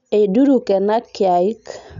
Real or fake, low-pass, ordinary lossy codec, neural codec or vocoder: real; 7.2 kHz; none; none